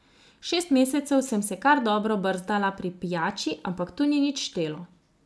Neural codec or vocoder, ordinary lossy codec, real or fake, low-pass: none; none; real; none